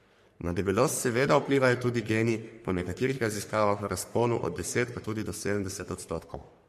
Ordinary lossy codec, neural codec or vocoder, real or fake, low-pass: MP3, 64 kbps; codec, 44.1 kHz, 3.4 kbps, Pupu-Codec; fake; 14.4 kHz